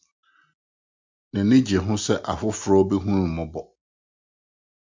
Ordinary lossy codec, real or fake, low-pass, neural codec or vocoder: MP3, 64 kbps; real; 7.2 kHz; none